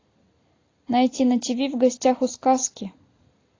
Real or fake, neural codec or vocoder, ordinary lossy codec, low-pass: real; none; AAC, 32 kbps; 7.2 kHz